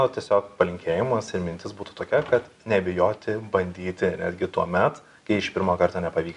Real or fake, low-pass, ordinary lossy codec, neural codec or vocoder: real; 10.8 kHz; MP3, 96 kbps; none